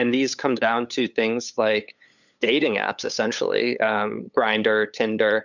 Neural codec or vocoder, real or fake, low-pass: codec, 16 kHz, 8 kbps, FunCodec, trained on LibriTTS, 25 frames a second; fake; 7.2 kHz